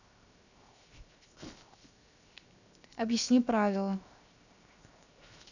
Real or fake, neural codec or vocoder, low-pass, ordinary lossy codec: fake; codec, 16 kHz, 0.7 kbps, FocalCodec; 7.2 kHz; none